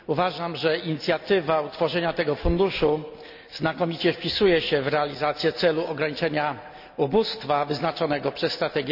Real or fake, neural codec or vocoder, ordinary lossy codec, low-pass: real; none; none; 5.4 kHz